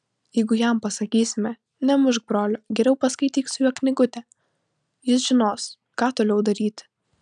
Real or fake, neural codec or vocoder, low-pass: real; none; 9.9 kHz